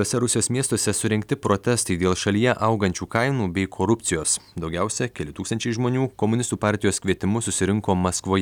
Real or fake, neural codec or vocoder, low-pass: real; none; 19.8 kHz